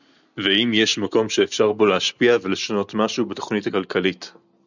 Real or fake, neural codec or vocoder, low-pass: real; none; 7.2 kHz